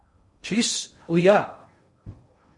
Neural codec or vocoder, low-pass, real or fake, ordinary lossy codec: codec, 16 kHz in and 24 kHz out, 0.6 kbps, FocalCodec, streaming, 2048 codes; 10.8 kHz; fake; MP3, 48 kbps